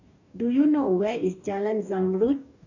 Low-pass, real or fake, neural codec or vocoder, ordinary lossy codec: 7.2 kHz; fake; codec, 44.1 kHz, 2.6 kbps, DAC; none